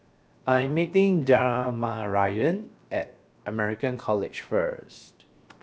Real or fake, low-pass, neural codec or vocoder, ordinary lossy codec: fake; none; codec, 16 kHz, 0.7 kbps, FocalCodec; none